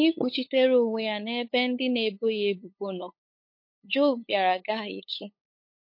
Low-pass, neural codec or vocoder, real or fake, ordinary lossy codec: 5.4 kHz; codec, 16 kHz, 8 kbps, FunCodec, trained on LibriTTS, 25 frames a second; fake; MP3, 32 kbps